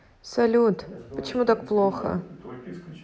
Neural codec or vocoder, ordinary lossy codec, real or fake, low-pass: none; none; real; none